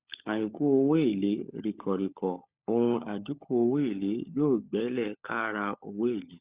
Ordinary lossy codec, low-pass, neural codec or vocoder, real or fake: Opus, 64 kbps; 3.6 kHz; codec, 16 kHz, 16 kbps, FunCodec, trained on LibriTTS, 50 frames a second; fake